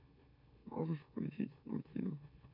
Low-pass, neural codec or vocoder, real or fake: 5.4 kHz; autoencoder, 44.1 kHz, a latent of 192 numbers a frame, MeloTTS; fake